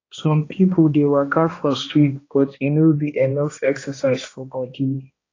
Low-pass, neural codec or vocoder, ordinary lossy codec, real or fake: 7.2 kHz; codec, 16 kHz, 1 kbps, X-Codec, HuBERT features, trained on balanced general audio; AAC, 32 kbps; fake